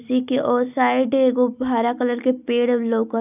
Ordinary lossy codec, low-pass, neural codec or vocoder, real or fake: none; 3.6 kHz; none; real